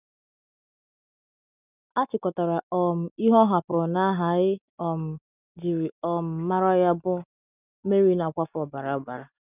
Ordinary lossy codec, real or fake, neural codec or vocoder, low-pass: none; real; none; 3.6 kHz